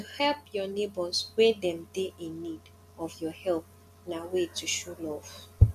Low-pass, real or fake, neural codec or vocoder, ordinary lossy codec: 14.4 kHz; real; none; none